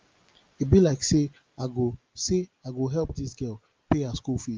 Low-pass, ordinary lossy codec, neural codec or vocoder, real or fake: 7.2 kHz; Opus, 32 kbps; none; real